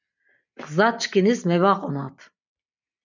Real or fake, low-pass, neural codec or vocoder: real; 7.2 kHz; none